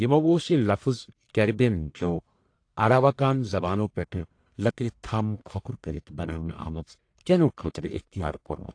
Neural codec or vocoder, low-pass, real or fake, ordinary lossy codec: codec, 44.1 kHz, 1.7 kbps, Pupu-Codec; 9.9 kHz; fake; AAC, 48 kbps